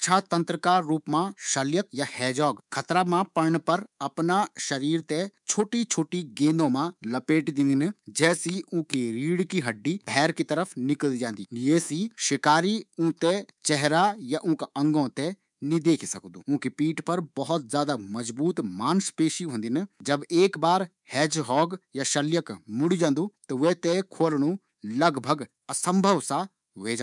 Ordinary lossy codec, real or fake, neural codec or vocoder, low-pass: none; fake; codec, 24 kHz, 3.1 kbps, DualCodec; 10.8 kHz